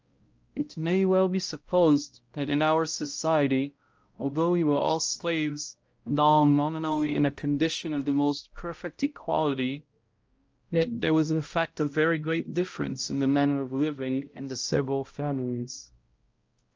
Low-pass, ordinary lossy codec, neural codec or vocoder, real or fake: 7.2 kHz; Opus, 24 kbps; codec, 16 kHz, 0.5 kbps, X-Codec, HuBERT features, trained on balanced general audio; fake